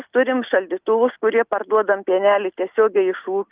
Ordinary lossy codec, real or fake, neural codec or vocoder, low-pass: Opus, 32 kbps; real; none; 3.6 kHz